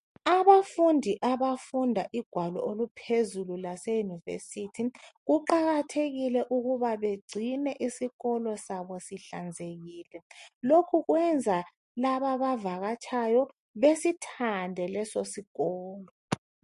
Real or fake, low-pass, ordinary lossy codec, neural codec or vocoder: real; 14.4 kHz; MP3, 48 kbps; none